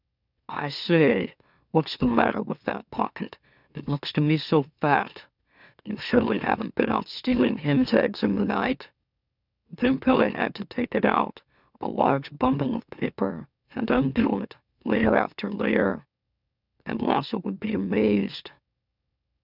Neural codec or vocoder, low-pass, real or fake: autoencoder, 44.1 kHz, a latent of 192 numbers a frame, MeloTTS; 5.4 kHz; fake